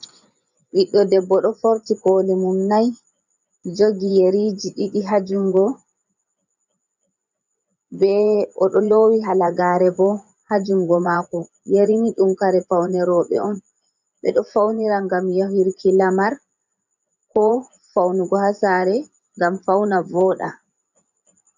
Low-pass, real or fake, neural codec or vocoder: 7.2 kHz; fake; vocoder, 24 kHz, 100 mel bands, Vocos